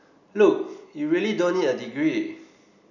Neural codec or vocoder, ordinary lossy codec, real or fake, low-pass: none; none; real; 7.2 kHz